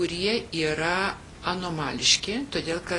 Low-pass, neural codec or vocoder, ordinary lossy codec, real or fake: 10.8 kHz; none; AAC, 32 kbps; real